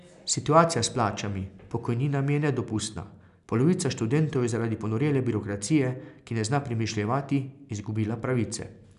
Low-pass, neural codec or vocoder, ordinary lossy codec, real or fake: 10.8 kHz; none; none; real